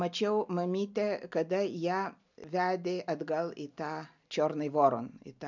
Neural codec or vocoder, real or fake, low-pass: none; real; 7.2 kHz